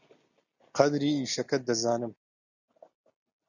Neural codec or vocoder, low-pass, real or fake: none; 7.2 kHz; real